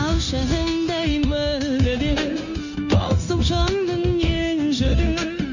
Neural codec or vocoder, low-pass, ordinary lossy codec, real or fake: codec, 16 kHz, 0.9 kbps, LongCat-Audio-Codec; 7.2 kHz; none; fake